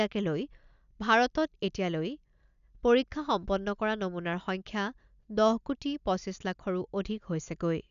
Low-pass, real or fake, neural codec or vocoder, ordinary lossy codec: 7.2 kHz; real; none; none